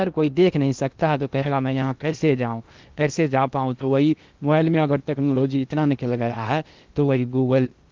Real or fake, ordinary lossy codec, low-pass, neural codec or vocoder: fake; Opus, 24 kbps; 7.2 kHz; codec, 16 kHz in and 24 kHz out, 0.8 kbps, FocalCodec, streaming, 65536 codes